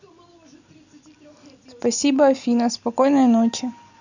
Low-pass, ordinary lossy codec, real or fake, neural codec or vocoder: 7.2 kHz; none; real; none